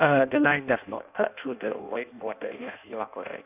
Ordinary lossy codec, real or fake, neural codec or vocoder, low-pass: none; fake; codec, 16 kHz in and 24 kHz out, 0.6 kbps, FireRedTTS-2 codec; 3.6 kHz